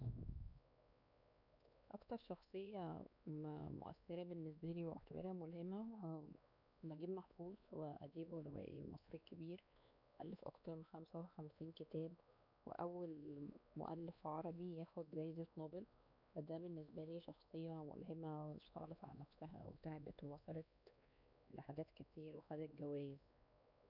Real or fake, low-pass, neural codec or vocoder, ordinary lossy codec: fake; 5.4 kHz; codec, 16 kHz, 2 kbps, X-Codec, WavLM features, trained on Multilingual LibriSpeech; none